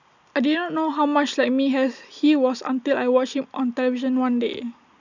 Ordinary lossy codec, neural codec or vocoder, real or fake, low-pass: none; none; real; 7.2 kHz